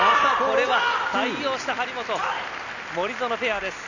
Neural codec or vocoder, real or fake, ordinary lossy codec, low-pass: none; real; none; 7.2 kHz